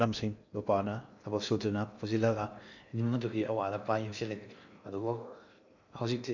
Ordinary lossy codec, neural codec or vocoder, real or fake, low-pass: none; codec, 16 kHz in and 24 kHz out, 0.6 kbps, FocalCodec, streaming, 2048 codes; fake; 7.2 kHz